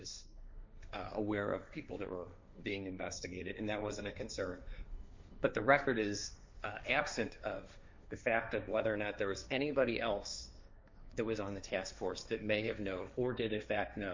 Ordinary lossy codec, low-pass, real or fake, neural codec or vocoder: MP3, 64 kbps; 7.2 kHz; fake; codec, 16 kHz, 1.1 kbps, Voila-Tokenizer